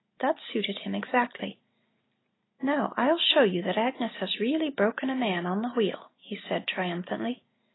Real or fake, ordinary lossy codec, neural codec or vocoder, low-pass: real; AAC, 16 kbps; none; 7.2 kHz